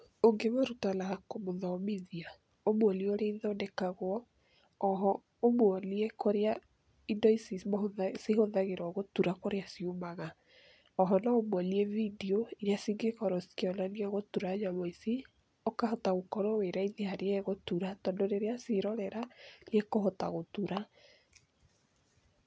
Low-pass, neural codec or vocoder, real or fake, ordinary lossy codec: none; none; real; none